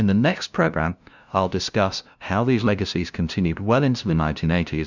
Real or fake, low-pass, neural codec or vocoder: fake; 7.2 kHz; codec, 16 kHz, 0.5 kbps, FunCodec, trained on LibriTTS, 25 frames a second